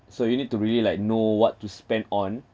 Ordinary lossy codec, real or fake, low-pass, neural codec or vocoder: none; real; none; none